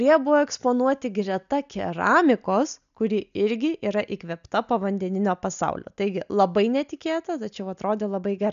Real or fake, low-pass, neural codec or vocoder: real; 7.2 kHz; none